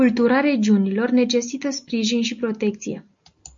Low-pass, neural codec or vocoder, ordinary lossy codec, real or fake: 7.2 kHz; none; MP3, 48 kbps; real